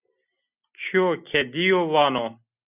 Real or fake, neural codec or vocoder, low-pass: real; none; 3.6 kHz